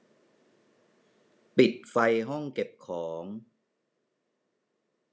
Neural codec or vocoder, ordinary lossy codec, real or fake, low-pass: none; none; real; none